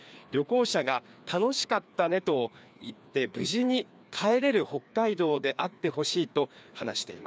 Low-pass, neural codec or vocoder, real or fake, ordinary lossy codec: none; codec, 16 kHz, 2 kbps, FreqCodec, larger model; fake; none